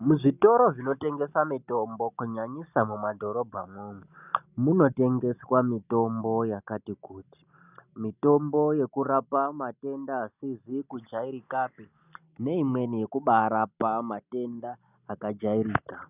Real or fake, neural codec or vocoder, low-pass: real; none; 3.6 kHz